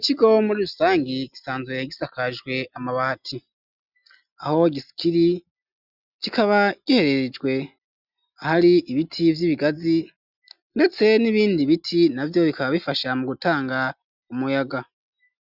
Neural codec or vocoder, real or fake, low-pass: none; real; 5.4 kHz